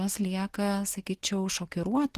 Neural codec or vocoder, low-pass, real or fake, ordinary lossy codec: none; 14.4 kHz; real; Opus, 16 kbps